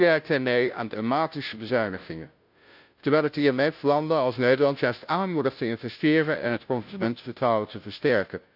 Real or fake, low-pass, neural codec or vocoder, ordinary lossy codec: fake; 5.4 kHz; codec, 16 kHz, 0.5 kbps, FunCodec, trained on Chinese and English, 25 frames a second; AAC, 48 kbps